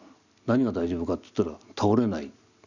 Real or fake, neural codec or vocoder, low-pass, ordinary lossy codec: real; none; 7.2 kHz; none